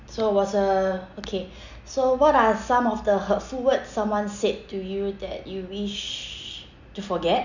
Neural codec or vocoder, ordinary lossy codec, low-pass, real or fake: none; none; 7.2 kHz; real